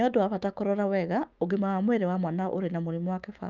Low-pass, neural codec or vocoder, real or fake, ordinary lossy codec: 7.2 kHz; autoencoder, 48 kHz, 128 numbers a frame, DAC-VAE, trained on Japanese speech; fake; Opus, 24 kbps